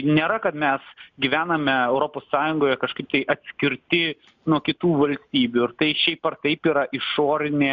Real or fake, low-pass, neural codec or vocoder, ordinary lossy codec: real; 7.2 kHz; none; Opus, 64 kbps